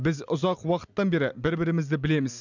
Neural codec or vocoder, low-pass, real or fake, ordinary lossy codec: none; 7.2 kHz; real; none